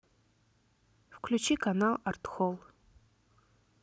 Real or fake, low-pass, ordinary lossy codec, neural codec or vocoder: real; none; none; none